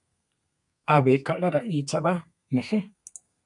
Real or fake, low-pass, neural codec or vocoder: fake; 10.8 kHz; codec, 32 kHz, 1.9 kbps, SNAC